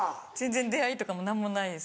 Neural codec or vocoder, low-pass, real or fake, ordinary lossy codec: none; none; real; none